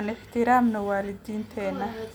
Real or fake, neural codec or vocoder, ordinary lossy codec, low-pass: real; none; none; none